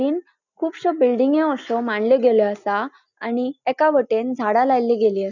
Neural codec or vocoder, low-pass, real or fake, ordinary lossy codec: none; 7.2 kHz; real; none